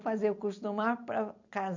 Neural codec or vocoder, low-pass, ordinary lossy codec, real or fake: none; 7.2 kHz; none; real